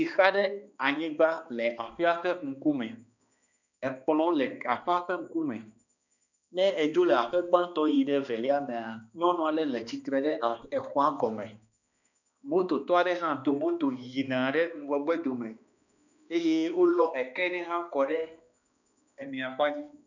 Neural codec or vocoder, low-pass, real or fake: codec, 16 kHz, 2 kbps, X-Codec, HuBERT features, trained on balanced general audio; 7.2 kHz; fake